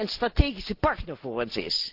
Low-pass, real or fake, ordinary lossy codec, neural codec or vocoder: 5.4 kHz; real; Opus, 16 kbps; none